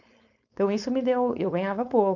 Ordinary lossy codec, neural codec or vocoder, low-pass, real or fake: none; codec, 16 kHz, 4.8 kbps, FACodec; 7.2 kHz; fake